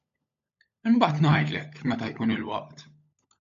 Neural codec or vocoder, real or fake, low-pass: codec, 16 kHz, 16 kbps, FunCodec, trained on LibriTTS, 50 frames a second; fake; 7.2 kHz